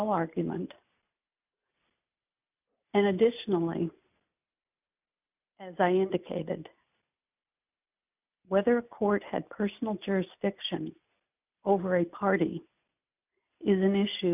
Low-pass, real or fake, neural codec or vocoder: 3.6 kHz; real; none